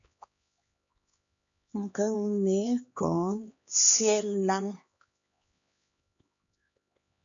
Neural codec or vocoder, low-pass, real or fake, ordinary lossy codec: codec, 16 kHz, 2 kbps, X-Codec, HuBERT features, trained on LibriSpeech; 7.2 kHz; fake; AAC, 64 kbps